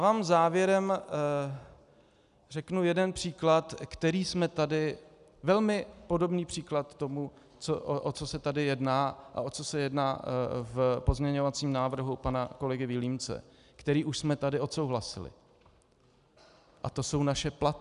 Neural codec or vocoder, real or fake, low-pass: none; real; 10.8 kHz